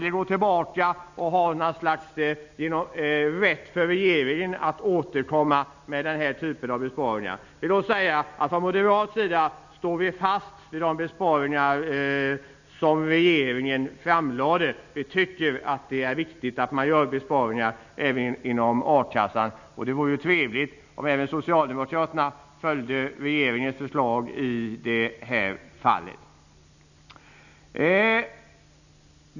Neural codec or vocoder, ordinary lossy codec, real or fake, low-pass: none; none; real; 7.2 kHz